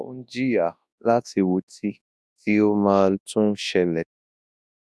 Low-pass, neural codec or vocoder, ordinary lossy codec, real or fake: none; codec, 24 kHz, 0.9 kbps, WavTokenizer, large speech release; none; fake